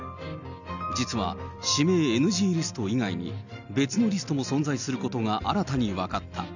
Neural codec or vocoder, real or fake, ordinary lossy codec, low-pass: none; real; none; 7.2 kHz